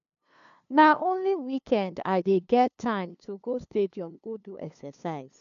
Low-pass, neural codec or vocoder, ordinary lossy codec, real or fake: 7.2 kHz; codec, 16 kHz, 2 kbps, FunCodec, trained on LibriTTS, 25 frames a second; MP3, 96 kbps; fake